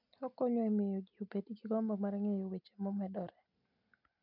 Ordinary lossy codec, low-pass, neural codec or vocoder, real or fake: none; 5.4 kHz; none; real